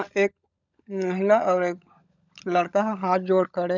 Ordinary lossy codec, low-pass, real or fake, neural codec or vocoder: none; 7.2 kHz; fake; codec, 16 kHz, 16 kbps, FunCodec, trained on LibriTTS, 50 frames a second